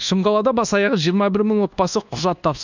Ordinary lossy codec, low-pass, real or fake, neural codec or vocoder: none; 7.2 kHz; fake; codec, 24 kHz, 1.2 kbps, DualCodec